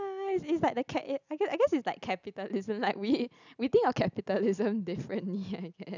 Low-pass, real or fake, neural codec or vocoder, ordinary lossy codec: 7.2 kHz; real; none; none